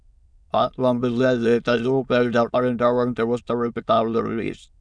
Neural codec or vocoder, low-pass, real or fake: autoencoder, 22.05 kHz, a latent of 192 numbers a frame, VITS, trained on many speakers; 9.9 kHz; fake